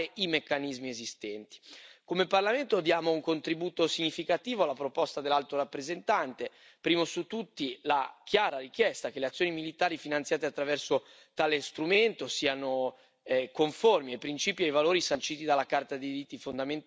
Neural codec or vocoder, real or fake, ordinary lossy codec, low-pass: none; real; none; none